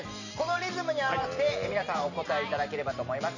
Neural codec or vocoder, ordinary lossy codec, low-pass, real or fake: vocoder, 44.1 kHz, 128 mel bands every 512 samples, BigVGAN v2; none; 7.2 kHz; fake